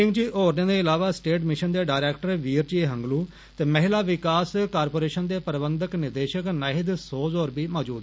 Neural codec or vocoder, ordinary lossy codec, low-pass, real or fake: none; none; none; real